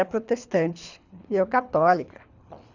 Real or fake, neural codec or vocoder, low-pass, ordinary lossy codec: fake; codec, 24 kHz, 3 kbps, HILCodec; 7.2 kHz; none